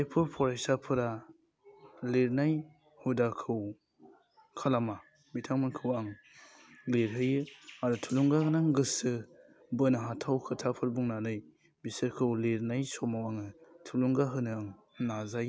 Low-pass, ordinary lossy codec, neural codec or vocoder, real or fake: none; none; none; real